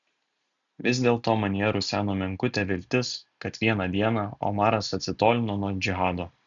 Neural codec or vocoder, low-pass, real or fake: none; 7.2 kHz; real